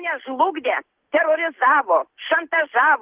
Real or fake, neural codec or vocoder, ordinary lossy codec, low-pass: real; none; Opus, 16 kbps; 3.6 kHz